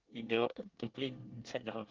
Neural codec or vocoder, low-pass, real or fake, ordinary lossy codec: codec, 24 kHz, 1 kbps, SNAC; 7.2 kHz; fake; Opus, 16 kbps